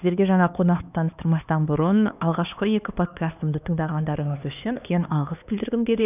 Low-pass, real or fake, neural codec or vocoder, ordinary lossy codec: 3.6 kHz; fake; codec, 16 kHz, 2 kbps, X-Codec, HuBERT features, trained on LibriSpeech; none